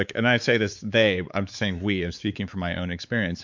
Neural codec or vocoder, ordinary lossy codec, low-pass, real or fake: codec, 16 kHz, 4 kbps, X-Codec, WavLM features, trained on Multilingual LibriSpeech; MP3, 64 kbps; 7.2 kHz; fake